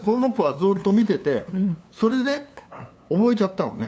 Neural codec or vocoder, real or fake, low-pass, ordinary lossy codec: codec, 16 kHz, 2 kbps, FunCodec, trained on LibriTTS, 25 frames a second; fake; none; none